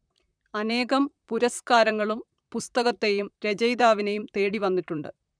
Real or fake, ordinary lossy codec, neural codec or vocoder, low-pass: fake; none; vocoder, 44.1 kHz, 128 mel bands, Pupu-Vocoder; 9.9 kHz